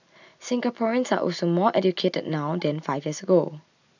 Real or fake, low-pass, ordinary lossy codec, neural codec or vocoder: real; 7.2 kHz; none; none